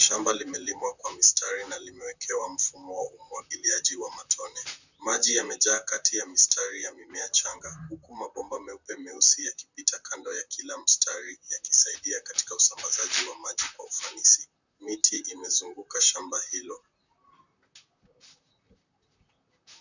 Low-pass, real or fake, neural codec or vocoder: 7.2 kHz; fake; vocoder, 44.1 kHz, 128 mel bands, Pupu-Vocoder